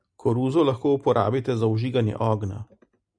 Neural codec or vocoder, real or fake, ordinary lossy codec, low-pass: none; real; MP3, 96 kbps; 9.9 kHz